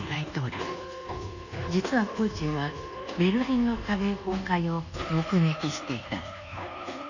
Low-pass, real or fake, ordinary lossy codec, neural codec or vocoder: 7.2 kHz; fake; none; codec, 24 kHz, 1.2 kbps, DualCodec